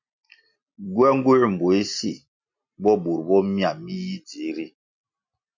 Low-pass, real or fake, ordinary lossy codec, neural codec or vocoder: 7.2 kHz; real; MP3, 48 kbps; none